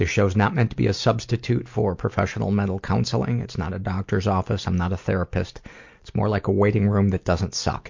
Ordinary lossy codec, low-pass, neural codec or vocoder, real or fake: MP3, 48 kbps; 7.2 kHz; none; real